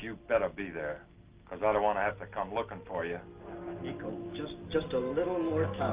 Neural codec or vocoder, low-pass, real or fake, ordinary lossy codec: none; 3.6 kHz; real; Opus, 32 kbps